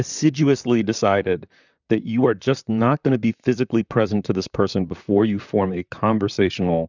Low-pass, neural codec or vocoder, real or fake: 7.2 kHz; vocoder, 44.1 kHz, 128 mel bands, Pupu-Vocoder; fake